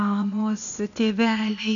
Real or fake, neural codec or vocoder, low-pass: fake; codec, 16 kHz, 0.8 kbps, ZipCodec; 7.2 kHz